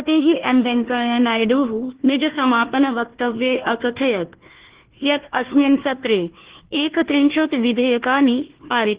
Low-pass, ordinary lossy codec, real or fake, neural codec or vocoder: 3.6 kHz; Opus, 16 kbps; fake; codec, 16 kHz, 1 kbps, FunCodec, trained on Chinese and English, 50 frames a second